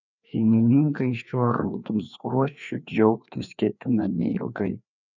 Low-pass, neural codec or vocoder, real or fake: 7.2 kHz; codec, 16 kHz, 2 kbps, FreqCodec, larger model; fake